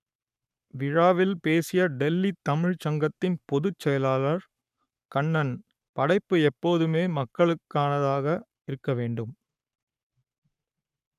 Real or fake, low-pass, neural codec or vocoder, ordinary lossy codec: fake; 14.4 kHz; codec, 44.1 kHz, 7.8 kbps, Pupu-Codec; none